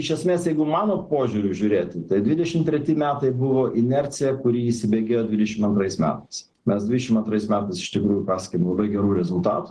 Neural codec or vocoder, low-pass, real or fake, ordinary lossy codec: none; 10.8 kHz; real; Opus, 16 kbps